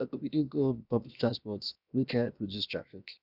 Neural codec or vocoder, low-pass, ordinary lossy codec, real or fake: codec, 16 kHz, 0.7 kbps, FocalCodec; 5.4 kHz; none; fake